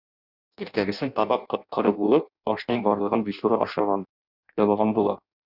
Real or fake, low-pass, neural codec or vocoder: fake; 5.4 kHz; codec, 16 kHz in and 24 kHz out, 0.6 kbps, FireRedTTS-2 codec